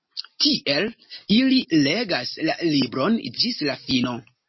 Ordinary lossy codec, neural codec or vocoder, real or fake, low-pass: MP3, 24 kbps; none; real; 7.2 kHz